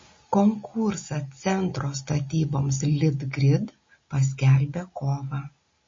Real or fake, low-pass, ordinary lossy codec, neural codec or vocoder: real; 7.2 kHz; MP3, 32 kbps; none